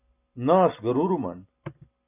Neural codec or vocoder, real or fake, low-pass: none; real; 3.6 kHz